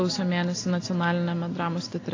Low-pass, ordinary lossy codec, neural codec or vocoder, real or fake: 7.2 kHz; AAC, 32 kbps; none; real